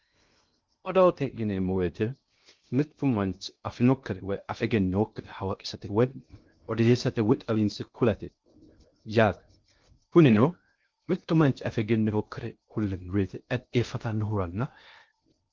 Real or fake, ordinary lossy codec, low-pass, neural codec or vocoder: fake; Opus, 32 kbps; 7.2 kHz; codec, 16 kHz in and 24 kHz out, 0.6 kbps, FocalCodec, streaming, 2048 codes